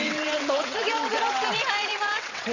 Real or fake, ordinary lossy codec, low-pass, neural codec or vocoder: fake; none; 7.2 kHz; vocoder, 22.05 kHz, 80 mel bands, WaveNeXt